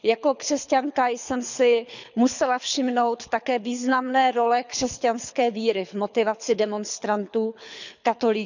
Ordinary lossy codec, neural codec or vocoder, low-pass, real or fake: none; codec, 24 kHz, 6 kbps, HILCodec; 7.2 kHz; fake